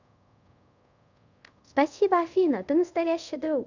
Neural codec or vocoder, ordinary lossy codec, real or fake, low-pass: codec, 24 kHz, 0.5 kbps, DualCodec; none; fake; 7.2 kHz